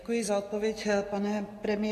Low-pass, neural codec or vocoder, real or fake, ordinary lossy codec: 14.4 kHz; none; real; AAC, 48 kbps